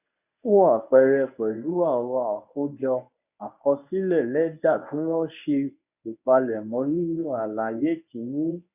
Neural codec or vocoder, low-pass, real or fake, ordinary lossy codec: codec, 24 kHz, 0.9 kbps, WavTokenizer, medium speech release version 1; 3.6 kHz; fake; none